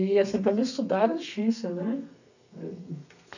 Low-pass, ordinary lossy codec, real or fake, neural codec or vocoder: 7.2 kHz; none; fake; codec, 32 kHz, 1.9 kbps, SNAC